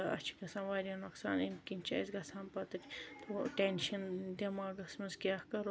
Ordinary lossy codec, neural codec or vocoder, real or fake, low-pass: none; none; real; none